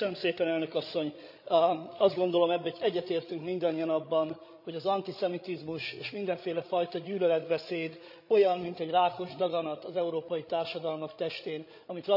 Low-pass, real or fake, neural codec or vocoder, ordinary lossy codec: 5.4 kHz; fake; codec, 16 kHz, 16 kbps, FreqCodec, larger model; none